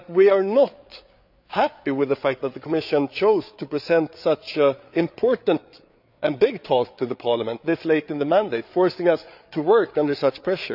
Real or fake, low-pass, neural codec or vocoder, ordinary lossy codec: fake; 5.4 kHz; codec, 16 kHz, 8 kbps, FreqCodec, larger model; none